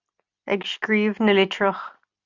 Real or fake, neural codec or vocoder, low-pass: real; none; 7.2 kHz